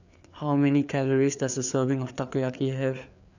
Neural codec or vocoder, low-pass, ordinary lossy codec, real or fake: codec, 16 kHz, 4 kbps, FreqCodec, larger model; 7.2 kHz; none; fake